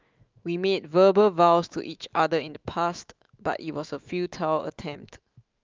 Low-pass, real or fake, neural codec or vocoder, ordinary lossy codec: 7.2 kHz; fake; autoencoder, 48 kHz, 128 numbers a frame, DAC-VAE, trained on Japanese speech; Opus, 24 kbps